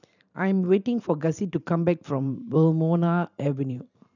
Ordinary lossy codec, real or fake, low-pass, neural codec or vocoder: none; fake; 7.2 kHz; vocoder, 44.1 kHz, 128 mel bands every 512 samples, BigVGAN v2